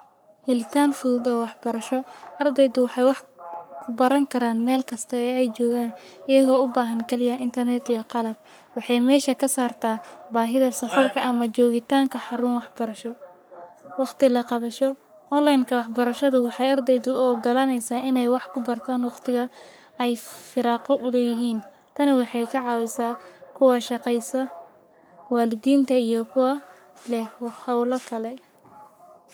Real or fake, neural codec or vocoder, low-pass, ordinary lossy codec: fake; codec, 44.1 kHz, 3.4 kbps, Pupu-Codec; none; none